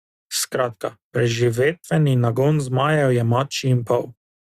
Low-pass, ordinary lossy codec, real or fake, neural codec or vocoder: 14.4 kHz; Opus, 64 kbps; real; none